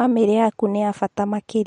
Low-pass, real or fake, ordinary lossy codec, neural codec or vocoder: 19.8 kHz; real; MP3, 48 kbps; none